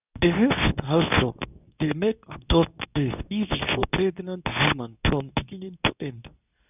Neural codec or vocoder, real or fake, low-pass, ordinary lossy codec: codec, 24 kHz, 0.9 kbps, WavTokenizer, medium speech release version 2; fake; 3.6 kHz; none